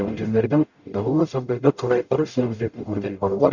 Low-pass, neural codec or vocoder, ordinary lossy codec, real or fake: 7.2 kHz; codec, 44.1 kHz, 0.9 kbps, DAC; Opus, 64 kbps; fake